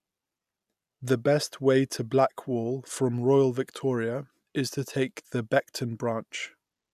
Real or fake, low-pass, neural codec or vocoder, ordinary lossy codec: real; 14.4 kHz; none; none